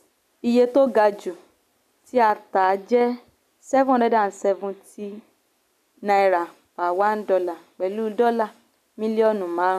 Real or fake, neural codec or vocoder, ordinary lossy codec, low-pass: real; none; none; 14.4 kHz